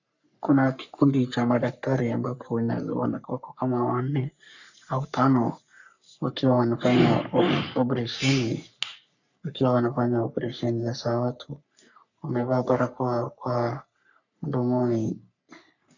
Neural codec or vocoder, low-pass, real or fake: codec, 44.1 kHz, 3.4 kbps, Pupu-Codec; 7.2 kHz; fake